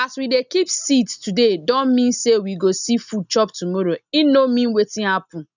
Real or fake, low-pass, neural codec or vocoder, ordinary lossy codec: real; 7.2 kHz; none; none